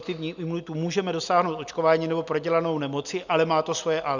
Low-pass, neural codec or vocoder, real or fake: 7.2 kHz; none; real